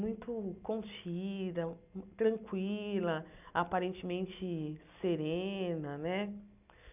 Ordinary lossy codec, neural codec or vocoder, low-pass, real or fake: none; none; 3.6 kHz; real